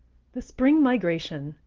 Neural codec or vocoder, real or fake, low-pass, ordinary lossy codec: none; real; 7.2 kHz; Opus, 16 kbps